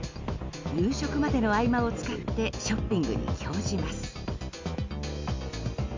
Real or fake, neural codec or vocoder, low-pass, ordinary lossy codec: real; none; 7.2 kHz; MP3, 64 kbps